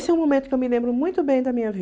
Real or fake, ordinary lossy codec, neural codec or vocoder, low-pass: real; none; none; none